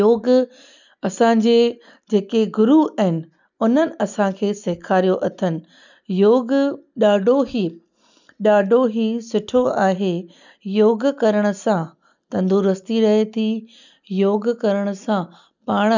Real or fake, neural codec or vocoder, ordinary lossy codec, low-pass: real; none; none; 7.2 kHz